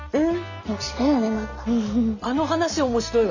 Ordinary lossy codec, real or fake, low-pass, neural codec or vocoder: none; real; 7.2 kHz; none